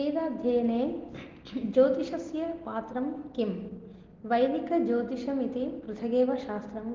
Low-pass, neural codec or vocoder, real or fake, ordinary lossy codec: 7.2 kHz; none; real; Opus, 16 kbps